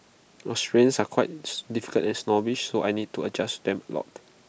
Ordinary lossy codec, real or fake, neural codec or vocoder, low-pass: none; real; none; none